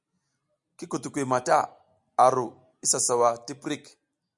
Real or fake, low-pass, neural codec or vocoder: real; 10.8 kHz; none